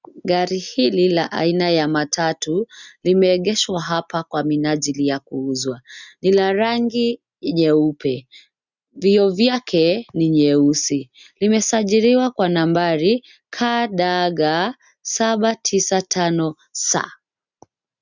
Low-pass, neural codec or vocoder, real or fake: 7.2 kHz; none; real